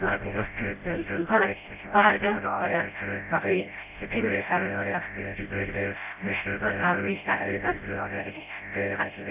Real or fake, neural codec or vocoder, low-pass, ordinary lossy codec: fake; codec, 16 kHz, 0.5 kbps, FreqCodec, smaller model; 3.6 kHz; none